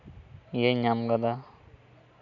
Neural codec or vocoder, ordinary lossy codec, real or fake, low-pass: none; none; real; 7.2 kHz